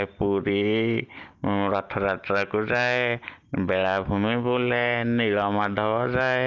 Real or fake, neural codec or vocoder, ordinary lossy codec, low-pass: real; none; Opus, 32 kbps; 7.2 kHz